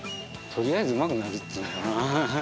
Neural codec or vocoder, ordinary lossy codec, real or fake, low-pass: none; none; real; none